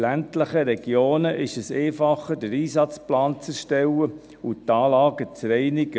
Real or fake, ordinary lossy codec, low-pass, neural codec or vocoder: real; none; none; none